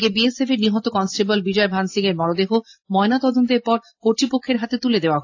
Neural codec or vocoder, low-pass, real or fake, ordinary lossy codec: none; 7.2 kHz; real; AAC, 48 kbps